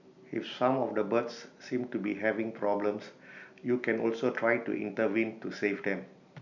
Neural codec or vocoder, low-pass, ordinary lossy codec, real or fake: none; 7.2 kHz; none; real